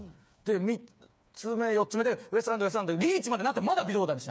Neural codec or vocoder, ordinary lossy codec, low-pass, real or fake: codec, 16 kHz, 4 kbps, FreqCodec, smaller model; none; none; fake